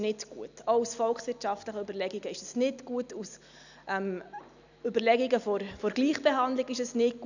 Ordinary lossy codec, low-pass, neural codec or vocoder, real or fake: none; 7.2 kHz; none; real